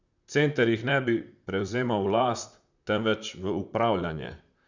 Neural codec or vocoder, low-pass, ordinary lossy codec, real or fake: vocoder, 44.1 kHz, 128 mel bands, Pupu-Vocoder; 7.2 kHz; none; fake